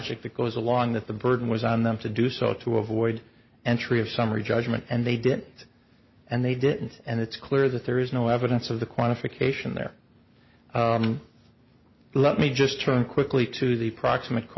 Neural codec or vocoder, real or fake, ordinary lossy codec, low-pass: none; real; MP3, 24 kbps; 7.2 kHz